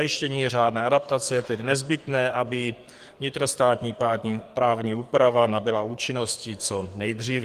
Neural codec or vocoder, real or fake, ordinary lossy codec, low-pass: codec, 44.1 kHz, 2.6 kbps, SNAC; fake; Opus, 32 kbps; 14.4 kHz